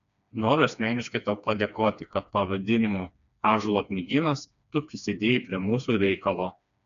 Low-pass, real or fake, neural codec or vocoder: 7.2 kHz; fake; codec, 16 kHz, 2 kbps, FreqCodec, smaller model